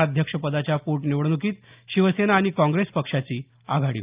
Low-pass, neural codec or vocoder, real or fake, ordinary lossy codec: 3.6 kHz; none; real; Opus, 24 kbps